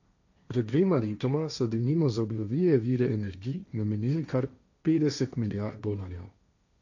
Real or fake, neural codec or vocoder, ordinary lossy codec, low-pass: fake; codec, 16 kHz, 1.1 kbps, Voila-Tokenizer; none; 7.2 kHz